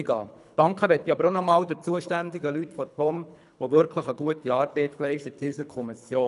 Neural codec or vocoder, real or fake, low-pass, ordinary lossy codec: codec, 24 kHz, 3 kbps, HILCodec; fake; 10.8 kHz; none